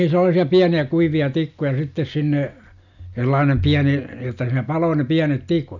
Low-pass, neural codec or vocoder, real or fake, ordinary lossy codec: 7.2 kHz; none; real; none